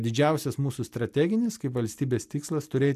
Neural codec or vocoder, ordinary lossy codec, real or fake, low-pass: none; MP3, 96 kbps; real; 14.4 kHz